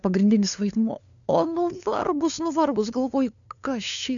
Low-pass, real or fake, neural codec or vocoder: 7.2 kHz; fake; codec, 16 kHz, 2 kbps, FunCodec, trained on LibriTTS, 25 frames a second